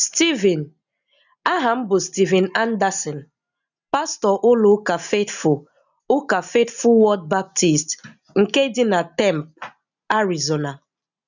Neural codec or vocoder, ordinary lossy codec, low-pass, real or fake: none; none; 7.2 kHz; real